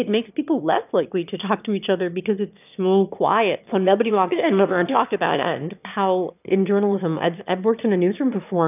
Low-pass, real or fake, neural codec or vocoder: 3.6 kHz; fake; autoencoder, 22.05 kHz, a latent of 192 numbers a frame, VITS, trained on one speaker